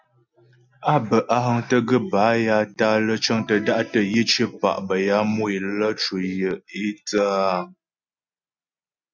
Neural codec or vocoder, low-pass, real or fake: none; 7.2 kHz; real